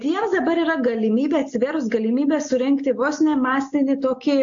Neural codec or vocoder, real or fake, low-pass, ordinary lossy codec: none; real; 7.2 kHz; AAC, 64 kbps